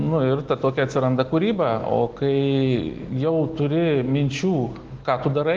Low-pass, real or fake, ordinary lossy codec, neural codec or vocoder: 7.2 kHz; real; Opus, 16 kbps; none